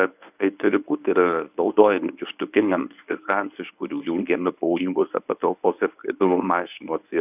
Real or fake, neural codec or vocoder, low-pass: fake; codec, 24 kHz, 0.9 kbps, WavTokenizer, medium speech release version 1; 3.6 kHz